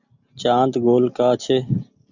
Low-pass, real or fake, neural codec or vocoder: 7.2 kHz; real; none